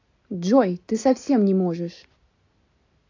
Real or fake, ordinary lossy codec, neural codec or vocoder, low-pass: real; AAC, 48 kbps; none; 7.2 kHz